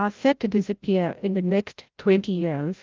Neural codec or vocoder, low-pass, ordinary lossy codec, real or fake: codec, 16 kHz, 0.5 kbps, FreqCodec, larger model; 7.2 kHz; Opus, 32 kbps; fake